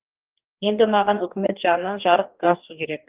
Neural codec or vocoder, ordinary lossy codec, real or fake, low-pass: codec, 44.1 kHz, 2.6 kbps, DAC; Opus, 32 kbps; fake; 3.6 kHz